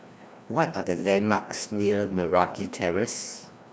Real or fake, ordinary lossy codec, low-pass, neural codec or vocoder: fake; none; none; codec, 16 kHz, 1 kbps, FreqCodec, larger model